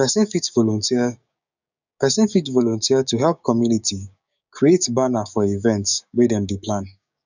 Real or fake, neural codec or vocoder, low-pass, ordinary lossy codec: fake; codec, 16 kHz, 6 kbps, DAC; 7.2 kHz; none